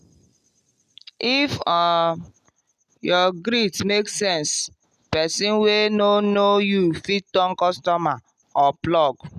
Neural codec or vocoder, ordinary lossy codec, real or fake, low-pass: none; none; real; 14.4 kHz